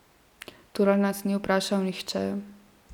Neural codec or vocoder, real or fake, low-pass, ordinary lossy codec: none; real; 19.8 kHz; none